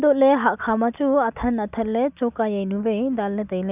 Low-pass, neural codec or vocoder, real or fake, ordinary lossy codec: 3.6 kHz; none; real; none